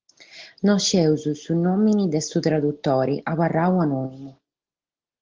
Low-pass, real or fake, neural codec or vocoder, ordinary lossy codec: 7.2 kHz; real; none; Opus, 16 kbps